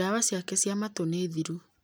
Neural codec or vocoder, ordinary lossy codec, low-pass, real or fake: none; none; none; real